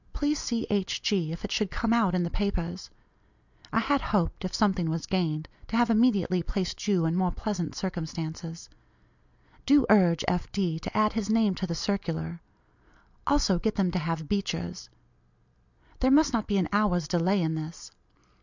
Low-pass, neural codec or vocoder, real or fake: 7.2 kHz; none; real